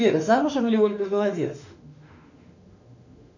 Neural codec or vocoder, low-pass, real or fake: autoencoder, 48 kHz, 32 numbers a frame, DAC-VAE, trained on Japanese speech; 7.2 kHz; fake